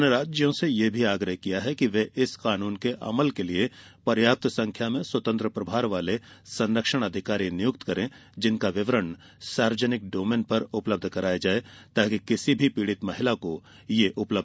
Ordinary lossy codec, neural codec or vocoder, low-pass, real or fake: none; none; none; real